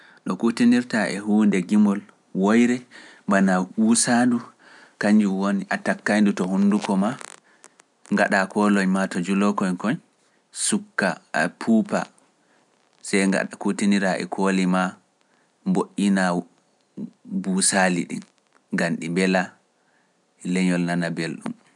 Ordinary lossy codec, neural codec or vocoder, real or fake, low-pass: none; none; real; 10.8 kHz